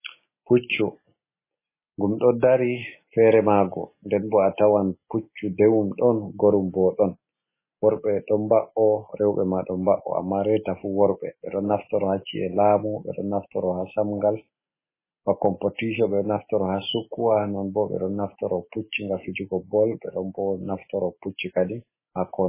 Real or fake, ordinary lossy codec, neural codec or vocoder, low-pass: real; MP3, 16 kbps; none; 3.6 kHz